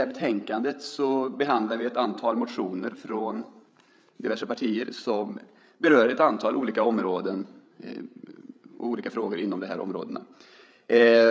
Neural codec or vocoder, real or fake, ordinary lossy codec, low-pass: codec, 16 kHz, 8 kbps, FreqCodec, larger model; fake; none; none